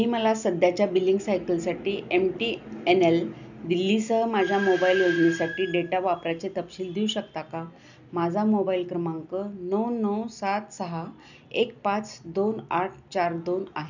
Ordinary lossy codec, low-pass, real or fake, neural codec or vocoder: none; 7.2 kHz; real; none